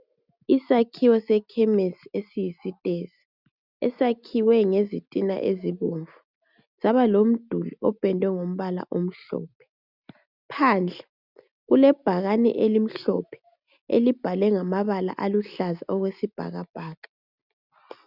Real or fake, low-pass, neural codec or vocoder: real; 5.4 kHz; none